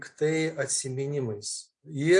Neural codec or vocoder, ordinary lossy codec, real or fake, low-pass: none; MP3, 48 kbps; real; 9.9 kHz